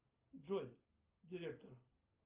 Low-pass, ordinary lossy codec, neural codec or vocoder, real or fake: 3.6 kHz; Opus, 32 kbps; none; real